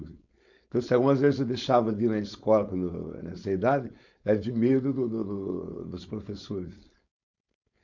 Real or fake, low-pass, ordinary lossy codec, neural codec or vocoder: fake; 7.2 kHz; none; codec, 16 kHz, 4.8 kbps, FACodec